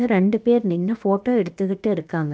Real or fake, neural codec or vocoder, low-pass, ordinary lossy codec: fake; codec, 16 kHz, about 1 kbps, DyCAST, with the encoder's durations; none; none